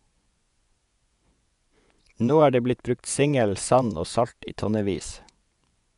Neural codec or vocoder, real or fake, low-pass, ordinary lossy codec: vocoder, 24 kHz, 100 mel bands, Vocos; fake; 10.8 kHz; none